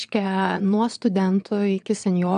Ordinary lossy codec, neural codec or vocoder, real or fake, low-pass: AAC, 96 kbps; vocoder, 22.05 kHz, 80 mel bands, Vocos; fake; 9.9 kHz